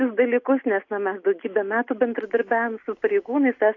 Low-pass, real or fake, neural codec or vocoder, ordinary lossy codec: 7.2 kHz; real; none; AAC, 48 kbps